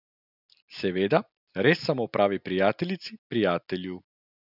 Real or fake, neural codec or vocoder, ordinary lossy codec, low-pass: real; none; none; 5.4 kHz